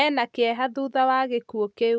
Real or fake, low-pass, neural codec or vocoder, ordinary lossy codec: real; none; none; none